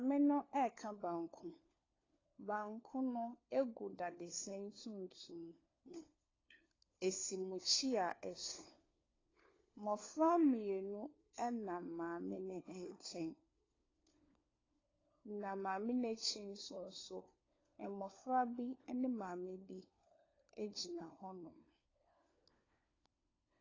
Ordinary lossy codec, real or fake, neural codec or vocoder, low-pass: AAC, 32 kbps; fake; codec, 16 kHz, 4 kbps, FunCodec, trained on LibriTTS, 50 frames a second; 7.2 kHz